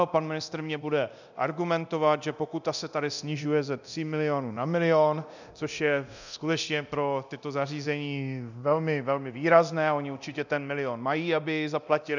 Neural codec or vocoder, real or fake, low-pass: codec, 24 kHz, 0.9 kbps, DualCodec; fake; 7.2 kHz